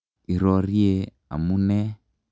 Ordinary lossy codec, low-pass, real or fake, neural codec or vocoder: none; none; real; none